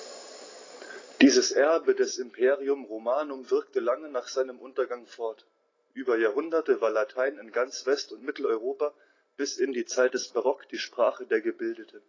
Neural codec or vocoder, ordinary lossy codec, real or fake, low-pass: none; AAC, 32 kbps; real; 7.2 kHz